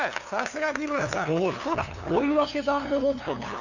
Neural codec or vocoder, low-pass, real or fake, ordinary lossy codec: codec, 16 kHz, 2 kbps, FunCodec, trained on LibriTTS, 25 frames a second; 7.2 kHz; fake; none